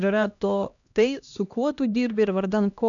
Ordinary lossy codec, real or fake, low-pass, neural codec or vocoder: MP3, 96 kbps; fake; 7.2 kHz; codec, 16 kHz, 1 kbps, X-Codec, HuBERT features, trained on LibriSpeech